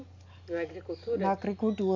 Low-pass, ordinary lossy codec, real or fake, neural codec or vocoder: 7.2 kHz; MP3, 48 kbps; real; none